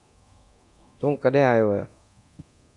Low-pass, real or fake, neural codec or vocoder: 10.8 kHz; fake; codec, 24 kHz, 0.9 kbps, DualCodec